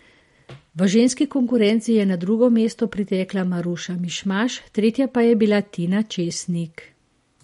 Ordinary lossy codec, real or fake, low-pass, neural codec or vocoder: MP3, 48 kbps; real; 19.8 kHz; none